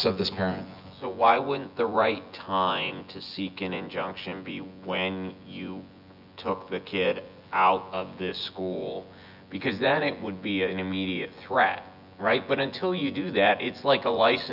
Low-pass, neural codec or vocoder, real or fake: 5.4 kHz; vocoder, 24 kHz, 100 mel bands, Vocos; fake